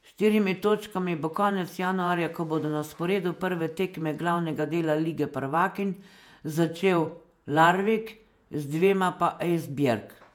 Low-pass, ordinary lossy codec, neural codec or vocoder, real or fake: 19.8 kHz; MP3, 96 kbps; vocoder, 48 kHz, 128 mel bands, Vocos; fake